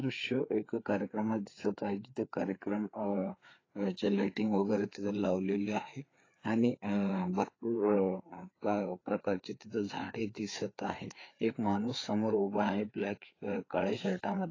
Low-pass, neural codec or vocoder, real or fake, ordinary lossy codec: 7.2 kHz; codec, 16 kHz, 4 kbps, FreqCodec, larger model; fake; AAC, 32 kbps